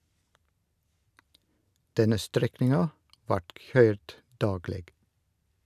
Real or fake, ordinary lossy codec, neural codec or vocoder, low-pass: real; none; none; 14.4 kHz